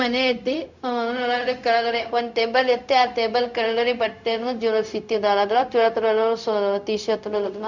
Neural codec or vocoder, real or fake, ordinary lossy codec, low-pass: codec, 16 kHz, 0.4 kbps, LongCat-Audio-Codec; fake; none; 7.2 kHz